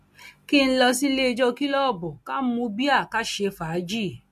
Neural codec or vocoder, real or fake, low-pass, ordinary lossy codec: none; real; 14.4 kHz; MP3, 64 kbps